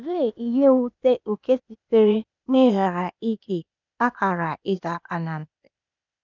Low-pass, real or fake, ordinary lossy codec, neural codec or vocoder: 7.2 kHz; fake; none; codec, 16 kHz, 0.8 kbps, ZipCodec